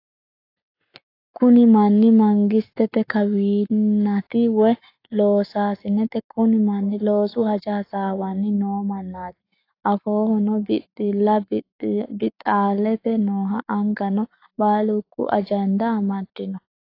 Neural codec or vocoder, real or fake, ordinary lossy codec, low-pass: codec, 44.1 kHz, 7.8 kbps, Pupu-Codec; fake; AAC, 32 kbps; 5.4 kHz